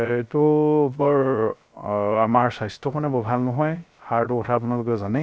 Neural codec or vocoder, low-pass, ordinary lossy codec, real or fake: codec, 16 kHz, 0.3 kbps, FocalCodec; none; none; fake